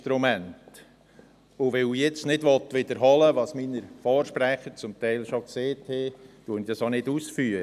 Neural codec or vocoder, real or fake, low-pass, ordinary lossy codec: none; real; 14.4 kHz; none